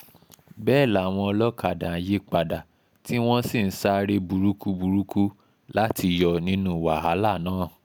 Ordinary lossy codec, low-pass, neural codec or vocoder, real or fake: none; none; none; real